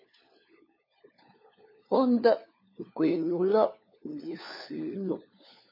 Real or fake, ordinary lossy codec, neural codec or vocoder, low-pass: fake; MP3, 24 kbps; codec, 16 kHz, 4 kbps, FunCodec, trained on LibriTTS, 50 frames a second; 5.4 kHz